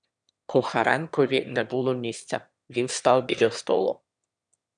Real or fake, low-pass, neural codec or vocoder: fake; 9.9 kHz; autoencoder, 22.05 kHz, a latent of 192 numbers a frame, VITS, trained on one speaker